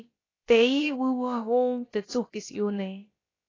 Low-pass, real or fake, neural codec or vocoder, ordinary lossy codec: 7.2 kHz; fake; codec, 16 kHz, about 1 kbps, DyCAST, with the encoder's durations; AAC, 32 kbps